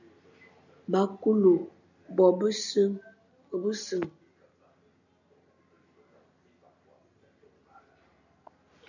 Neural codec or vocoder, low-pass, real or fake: none; 7.2 kHz; real